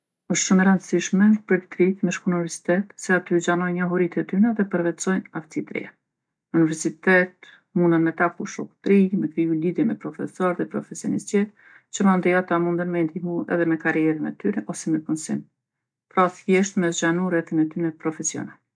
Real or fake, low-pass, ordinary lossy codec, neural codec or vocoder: real; none; none; none